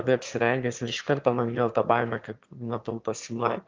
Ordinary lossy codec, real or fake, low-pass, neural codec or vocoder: Opus, 32 kbps; fake; 7.2 kHz; autoencoder, 22.05 kHz, a latent of 192 numbers a frame, VITS, trained on one speaker